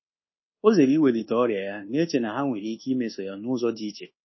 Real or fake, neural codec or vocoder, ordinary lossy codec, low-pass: fake; codec, 24 kHz, 1.2 kbps, DualCodec; MP3, 24 kbps; 7.2 kHz